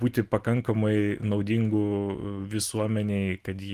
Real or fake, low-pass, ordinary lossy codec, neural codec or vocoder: real; 14.4 kHz; Opus, 24 kbps; none